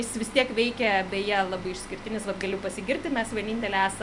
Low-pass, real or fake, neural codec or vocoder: 10.8 kHz; real; none